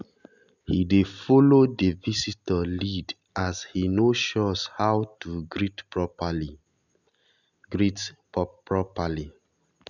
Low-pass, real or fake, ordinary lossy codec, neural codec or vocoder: 7.2 kHz; real; none; none